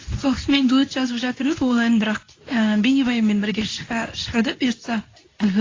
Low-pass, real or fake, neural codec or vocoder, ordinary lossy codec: 7.2 kHz; fake; codec, 24 kHz, 0.9 kbps, WavTokenizer, medium speech release version 2; AAC, 32 kbps